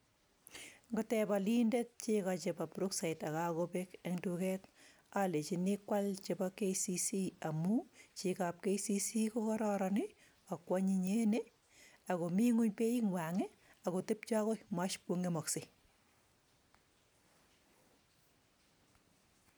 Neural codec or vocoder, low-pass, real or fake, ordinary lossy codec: none; none; real; none